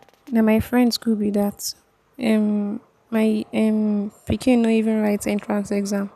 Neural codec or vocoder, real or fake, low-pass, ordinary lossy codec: none; real; 14.4 kHz; none